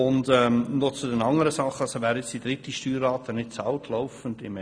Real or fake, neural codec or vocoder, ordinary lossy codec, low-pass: real; none; none; 9.9 kHz